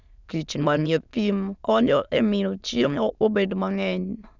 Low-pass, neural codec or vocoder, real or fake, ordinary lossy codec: 7.2 kHz; autoencoder, 22.05 kHz, a latent of 192 numbers a frame, VITS, trained on many speakers; fake; none